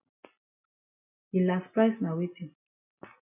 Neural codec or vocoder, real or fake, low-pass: none; real; 3.6 kHz